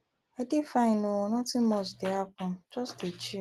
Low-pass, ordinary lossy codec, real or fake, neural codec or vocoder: 14.4 kHz; Opus, 24 kbps; real; none